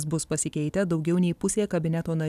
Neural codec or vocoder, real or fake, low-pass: none; real; 14.4 kHz